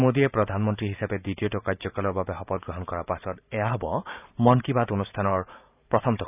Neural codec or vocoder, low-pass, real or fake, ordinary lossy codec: vocoder, 44.1 kHz, 128 mel bands every 512 samples, BigVGAN v2; 3.6 kHz; fake; none